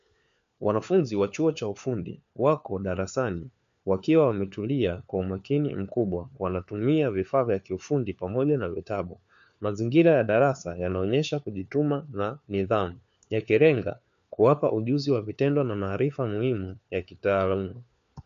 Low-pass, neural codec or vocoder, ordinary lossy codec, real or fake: 7.2 kHz; codec, 16 kHz, 4 kbps, FunCodec, trained on LibriTTS, 50 frames a second; MP3, 64 kbps; fake